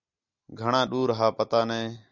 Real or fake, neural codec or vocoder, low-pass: real; none; 7.2 kHz